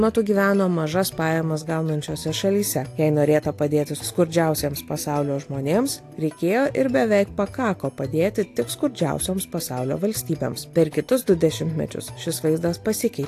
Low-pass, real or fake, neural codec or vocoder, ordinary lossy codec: 14.4 kHz; real; none; AAC, 64 kbps